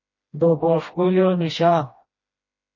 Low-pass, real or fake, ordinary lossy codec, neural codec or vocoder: 7.2 kHz; fake; MP3, 32 kbps; codec, 16 kHz, 1 kbps, FreqCodec, smaller model